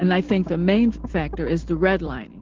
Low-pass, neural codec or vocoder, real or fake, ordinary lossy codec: 7.2 kHz; none; real; Opus, 16 kbps